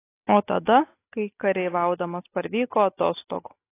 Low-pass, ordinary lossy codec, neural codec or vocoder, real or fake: 3.6 kHz; AAC, 24 kbps; none; real